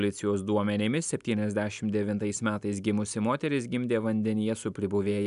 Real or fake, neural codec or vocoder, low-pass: real; none; 10.8 kHz